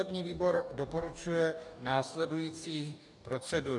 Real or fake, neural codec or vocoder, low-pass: fake; codec, 44.1 kHz, 2.6 kbps, DAC; 10.8 kHz